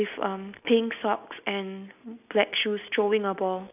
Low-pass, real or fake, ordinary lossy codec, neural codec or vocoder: 3.6 kHz; real; none; none